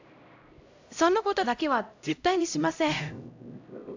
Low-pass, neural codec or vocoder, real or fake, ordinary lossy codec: 7.2 kHz; codec, 16 kHz, 0.5 kbps, X-Codec, HuBERT features, trained on LibriSpeech; fake; AAC, 48 kbps